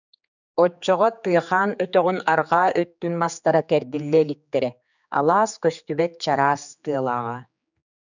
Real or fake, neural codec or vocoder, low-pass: fake; codec, 16 kHz, 4 kbps, X-Codec, HuBERT features, trained on general audio; 7.2 kHz